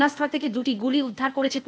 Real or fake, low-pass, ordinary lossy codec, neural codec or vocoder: fake; none; none; codec, 16 kHz, 0.8 kbps, ZipCodec